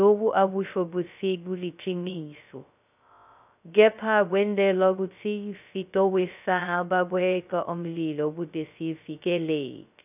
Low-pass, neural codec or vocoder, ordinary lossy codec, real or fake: 3.6 kHz; codec, 16 kHz, 0.2 kbps, FocalCodec; none; fake